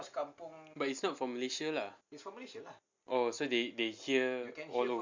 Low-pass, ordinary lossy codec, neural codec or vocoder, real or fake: 7.2 kHz; MP3, 64 kbps; none; real